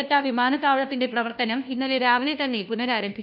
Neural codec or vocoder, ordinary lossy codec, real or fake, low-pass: codec, 16 kHz, 1 kbps, FunCodec, trained on LibriTTS, 50 frames a second; none; fake; 5.4 kHz